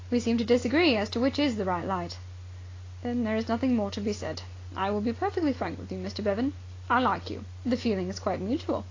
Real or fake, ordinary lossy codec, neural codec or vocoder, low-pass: real; AAC, 32 kbps; none; 7.2 kHz